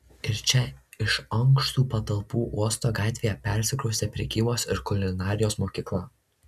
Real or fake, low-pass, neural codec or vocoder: real; 14.4 kHz; none